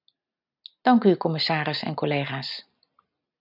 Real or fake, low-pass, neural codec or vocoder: real; 5.4 kHz; none